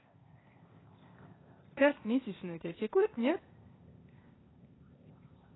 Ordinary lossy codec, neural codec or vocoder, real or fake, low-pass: AAC, 16 kbps; codec, 16 kHz, 0.8 kbps, ZipCodec; fake; 7.2 kHz